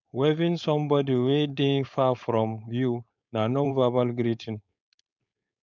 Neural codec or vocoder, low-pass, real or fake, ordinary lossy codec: codec, 16 kHz, 4.8 kbps, FACodec; 7.2 kHz; fake; none